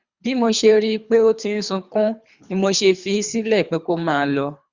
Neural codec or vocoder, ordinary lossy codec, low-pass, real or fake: codec, 24 kHz, 3 kbps, HILCodec; Opus, 64 kbps; 7.2 kHz; fake